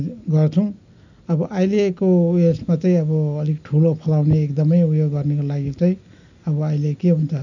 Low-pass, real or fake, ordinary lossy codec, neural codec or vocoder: 7.2 kHz; real; none; none